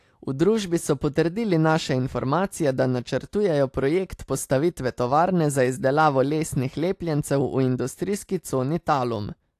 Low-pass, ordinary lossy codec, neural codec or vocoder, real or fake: 14.4 kHz; AAC, 64 kbps; none; real